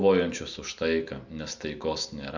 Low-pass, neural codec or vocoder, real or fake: 7.2 kHz; none; real